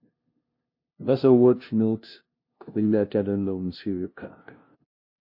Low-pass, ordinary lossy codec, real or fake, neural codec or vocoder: 5.4 kHz; MP3, 32 kbps; fake; codec, 16 kHz, 0.5 kbps, FunCodec, trained on LibriTTS, 25 frames a second